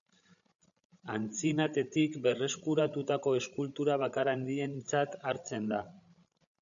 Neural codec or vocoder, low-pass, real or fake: codec, 16 kHz, 16 kbps, FreqCodec, larger model; 7.2 kHz; fake